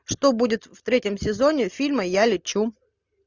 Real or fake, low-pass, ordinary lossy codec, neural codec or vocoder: real; 7.2 kHz; Opus, 64 kbps; none